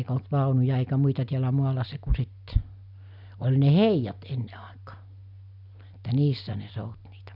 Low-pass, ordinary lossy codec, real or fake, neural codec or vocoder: 5.4 kHz; none; real; none